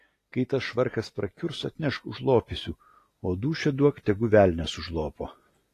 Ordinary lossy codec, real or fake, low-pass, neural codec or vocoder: AAC, 48 kbps; real; 14.4 kHz; none